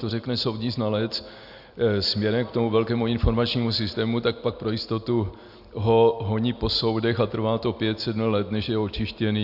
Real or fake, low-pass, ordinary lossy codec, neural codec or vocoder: real; 5.4 kHz; AAC, 48 kbps; none